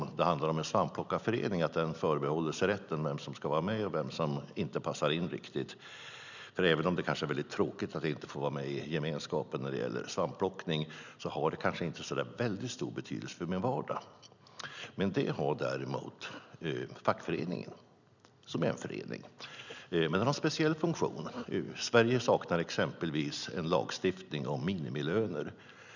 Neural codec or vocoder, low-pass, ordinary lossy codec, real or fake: none; 7.2 kHz; none; real